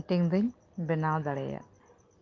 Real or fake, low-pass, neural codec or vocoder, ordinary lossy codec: real; 7.2 kHz; none; Opus, 32 kbps